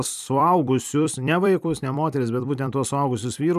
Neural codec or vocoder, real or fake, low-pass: vocoder, 44.1 kHz, 128 mel bands every 256 samples, BigVGAN v2; fake; 14.4 kHz